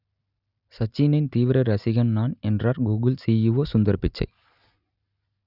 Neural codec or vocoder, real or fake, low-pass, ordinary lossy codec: none; real; 5.4 kHz; none